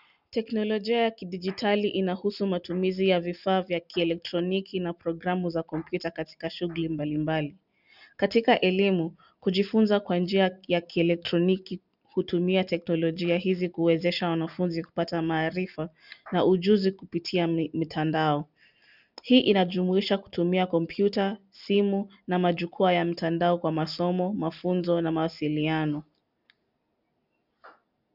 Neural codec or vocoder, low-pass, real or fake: none; 5.4 kHz; real